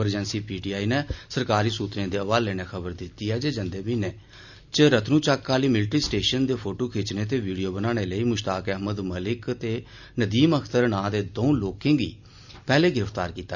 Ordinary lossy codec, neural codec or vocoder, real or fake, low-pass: none; none; real; 7.2 kHz